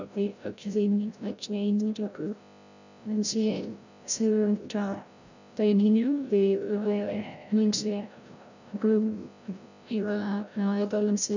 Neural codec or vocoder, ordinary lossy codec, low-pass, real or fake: codec, 16 kHz, 0.5 kbps, FreqCodec, larger model; none; 7.2 kHz; fake